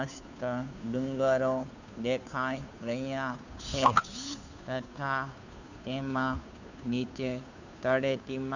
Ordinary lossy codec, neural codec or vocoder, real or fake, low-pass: none; codec, 16 kHz, 2 kbps, FunCodec, trained on Chinese and English, 25 frames a second; fake; 7.2 kHz